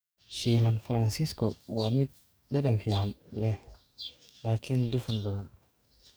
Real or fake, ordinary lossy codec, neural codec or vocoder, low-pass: fake; none; codec, 44.1 kHz, 2.6 kbps, DAC; none